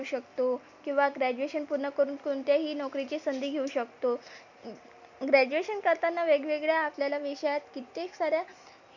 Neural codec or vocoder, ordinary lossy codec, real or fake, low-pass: none; none; real; 7.2 kHz